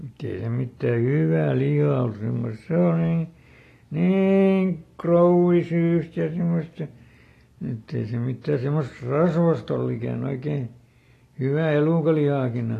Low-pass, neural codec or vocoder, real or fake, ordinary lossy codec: 14.4 kHz; none; real; AAC, 48 kbps